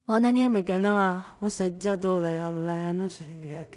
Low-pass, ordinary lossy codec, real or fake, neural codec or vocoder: 10.8 kHz; none; fake; codec, 16 kHz in and 24 kHz out, 0.4 kbps, LongCat-Audio-Codec, two codebook decoder